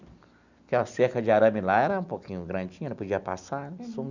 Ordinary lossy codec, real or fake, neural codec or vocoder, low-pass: none; real; none; 7.2 kHz